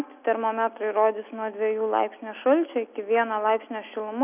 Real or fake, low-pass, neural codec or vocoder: real; 3.6 kHz; none